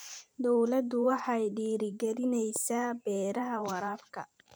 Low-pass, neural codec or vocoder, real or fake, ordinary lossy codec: none; vocoder, 44.1 kHz, 128 mel bands every 512 samples, BigVGAN v2; fake; none